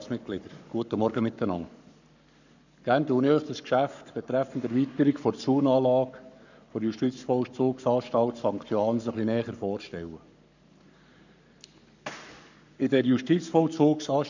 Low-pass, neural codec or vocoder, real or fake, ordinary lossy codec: 7.2 kHz; codec, 44.1 kHz, 7.8 kbps, Pupu-Codec; fake; none